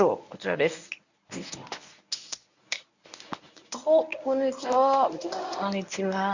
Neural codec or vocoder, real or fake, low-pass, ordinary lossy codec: codec, 24 kHz, 0.9 kbps, WavTokenizer, medium speech release version 1; fake; 7.2 kHz; none